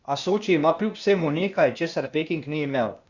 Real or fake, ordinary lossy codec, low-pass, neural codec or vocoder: fake; Opus, 64 kbps; 7.2 kHz; codec, 16 kHz, 0.8 kbps, ZipCodec